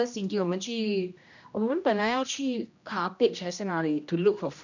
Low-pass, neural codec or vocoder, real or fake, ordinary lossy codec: 7.2 kHz; codec, 16 kHz, 1 kbps, X-Codec, HuBERT features, trained on general audio; fake; none